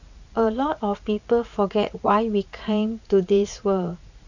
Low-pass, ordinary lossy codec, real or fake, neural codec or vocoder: 7.2 kHz; none; fake; vocoder, 44.1 kHz, 80 mel bands, Vocos